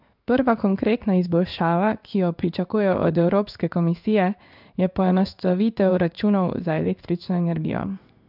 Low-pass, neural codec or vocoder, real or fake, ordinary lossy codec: 5.4 kHz; codec, 16 kHz in and 24 kHz out, 1 kbps, XY-Tokenizer; fake; none